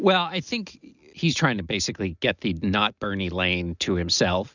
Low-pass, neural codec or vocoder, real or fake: 7.2 kHz; none; real